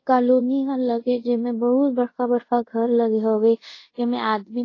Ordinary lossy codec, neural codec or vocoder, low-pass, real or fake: AAC, 32 kbps; codec, 24 kHz, 0.5 kbps, DualCodec; 7.2 kHz; fake